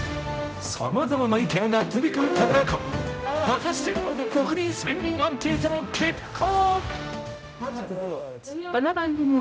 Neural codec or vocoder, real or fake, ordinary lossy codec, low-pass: codec, 16 kHz, 0.5 kbps, X-Codec, HuBERT features, trained on general audio; fake; none; none